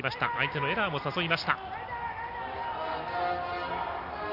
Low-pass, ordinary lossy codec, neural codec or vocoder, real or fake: 5.4 kHz; AAC, 32 kbps; none; real